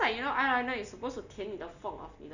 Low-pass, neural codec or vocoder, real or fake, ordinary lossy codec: 7.2 kHz; none; real; none